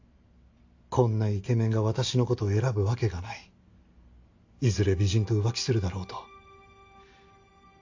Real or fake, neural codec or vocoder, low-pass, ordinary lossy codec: real; none; 7.2 kHz; AAC, 48 kbps